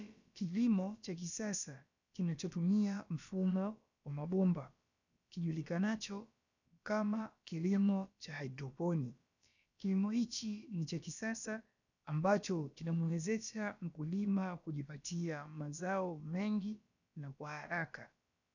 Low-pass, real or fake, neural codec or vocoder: 7.2 kHz; fake; codec, 16 kHz, about 1 kbps, DyCAST, with the encoder's durations